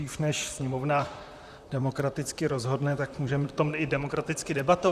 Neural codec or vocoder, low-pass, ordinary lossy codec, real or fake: vocoder, 44.1 kHz, 128 mel bands, Pupu-Vocoder; 14.4 kHz; Opus, 64 kbps; fake